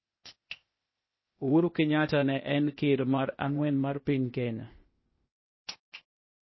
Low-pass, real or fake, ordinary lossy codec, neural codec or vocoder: 7.2 kHz; fake; MP3, 24 kbps; codec, 16 kHz, 0.8 kbps, ZipCodec